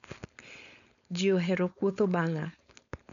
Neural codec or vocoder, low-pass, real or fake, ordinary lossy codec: codec, 16 kHz, 4.8 kbps, FACodec; 7.2 kHz; fake; none